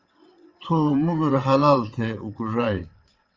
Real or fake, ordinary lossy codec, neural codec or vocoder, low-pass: fake; Opus, 32 kbps; vocoder, 22.05 kHz, 80 mel bands, WaveNeXt; 7.2 kHz